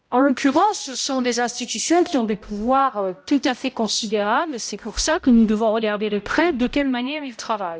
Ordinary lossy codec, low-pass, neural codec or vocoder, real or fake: none; none; codec, 16 kHz, 0.5 kbps, X-Codec, HuBERT features, trained on balanced general audio; fake